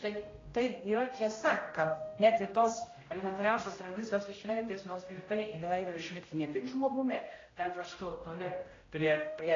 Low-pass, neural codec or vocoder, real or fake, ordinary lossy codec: 7.2 kHz; codec, 16 kHz, 0.5 kbps, X-Codec, HuBERT features, trained on general audio; fake; AAC, 32 kbps